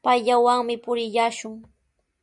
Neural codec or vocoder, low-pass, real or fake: none; 10.8 kHz; real